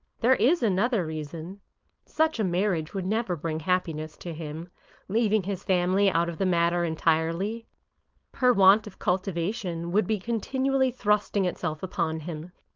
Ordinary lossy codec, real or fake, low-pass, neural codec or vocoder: Opus, 24 kbps; fake; 7.2 kHz; codec, 16 kHz, 4.8 kbps, FACodec